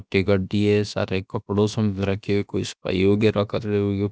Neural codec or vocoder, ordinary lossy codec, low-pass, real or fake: codec, 16 kHz, about 1 kbps, DyCAST, with the encoder's durations; none; none; fake